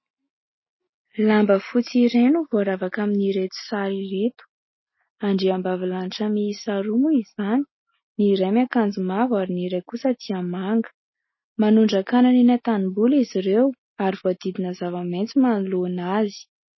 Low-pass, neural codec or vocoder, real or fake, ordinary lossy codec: 7.2 kHz; none; real; MP3, 24 kbps